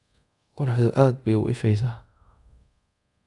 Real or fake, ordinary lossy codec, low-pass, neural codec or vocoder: fake; none; 10.8 kHz; codec, 24 kHz, 0.5 kbps, DualCodec